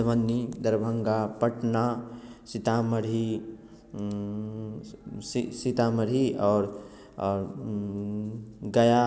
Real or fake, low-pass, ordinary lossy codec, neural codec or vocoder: real; none; none; none